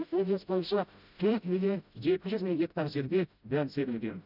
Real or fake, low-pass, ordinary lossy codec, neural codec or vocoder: fake; 5.4 kHz; none; codec, 16 kHz, 0.5 kbps, FreqCodec, smaller model